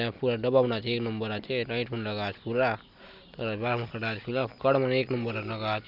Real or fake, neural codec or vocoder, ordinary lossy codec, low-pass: real; none; none; 5.4 kHz